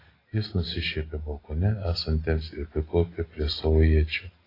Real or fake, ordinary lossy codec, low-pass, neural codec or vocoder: real; AAC, 24 kbps; 5.4 kHz; none